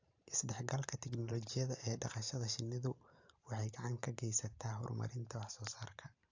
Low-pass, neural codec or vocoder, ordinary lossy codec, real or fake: 7.2 kHz; vocoder, 44.1 kHz, 128 mel bands every 512 samples, BigVGAN v2; AAC, 48 kbps; fake